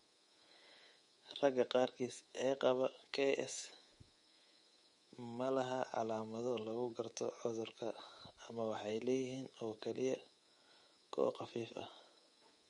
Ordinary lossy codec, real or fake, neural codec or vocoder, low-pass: MP3, 48 kbps; fake; codec, 24 kHz, 3.1 kbps, DualCodec; 10.8 kHz